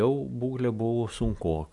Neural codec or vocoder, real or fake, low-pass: none; real; 10.8 kHz